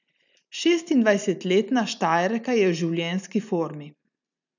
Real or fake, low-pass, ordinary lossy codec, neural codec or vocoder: real; 7.2 kHz; none; none